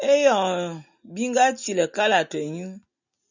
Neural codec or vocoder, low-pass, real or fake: none; 7.2 kHz; real